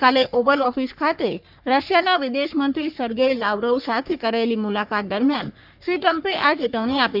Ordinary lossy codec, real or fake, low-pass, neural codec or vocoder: none; fake; 5.4 kHz; codec, 44.1 kHz, 3.4 kbps, Pupu-Codec